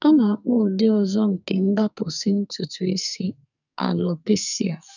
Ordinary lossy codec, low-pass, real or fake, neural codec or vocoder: none; 7.2 kHz; fake; codec, 44.1 kHz, 2.6 kbps, SNAC